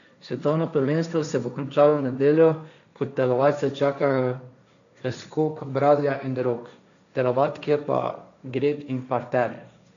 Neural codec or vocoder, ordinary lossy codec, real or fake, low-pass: codec, 16 kHz, 1.1 kbps, Voila-Tokenizer; none; fake; 7.2 kHz